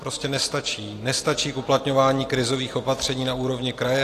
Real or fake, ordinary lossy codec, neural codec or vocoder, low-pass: real; AAC, 48 kbps; none; 14.4 kHz